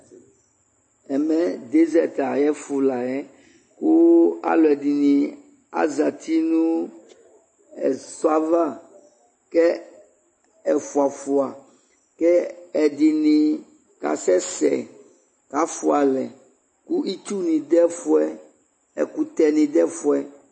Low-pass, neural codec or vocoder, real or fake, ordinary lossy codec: 9.9 kHz; none; real; MP3, 32 kbps